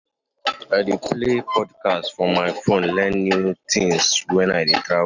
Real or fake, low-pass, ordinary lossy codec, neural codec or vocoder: real; 7.2 kHz; none; none